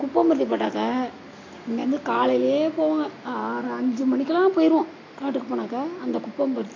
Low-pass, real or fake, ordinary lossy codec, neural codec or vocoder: 7.2 kHz; real; AAC, 32 kbps; none